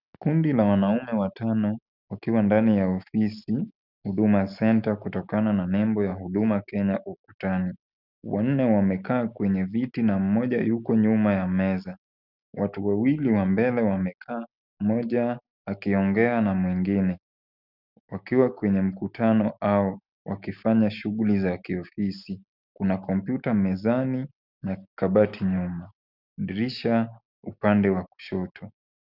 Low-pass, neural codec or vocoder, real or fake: 5.4 kHz; none; real